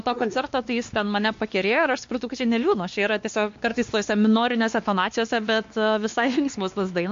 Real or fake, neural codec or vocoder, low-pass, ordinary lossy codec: fake; codec, 16 kHz, 2 kbps, X-Codec, WavLM features, trained on Multilingual LibriSpeech; 7.2 kHz; MP3, 48 kbps